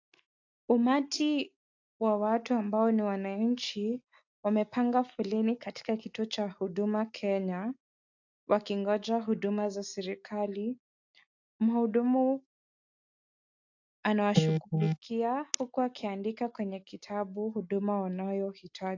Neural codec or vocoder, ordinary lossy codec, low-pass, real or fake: none; AAC, 48 kbps; 7.2 kHz; real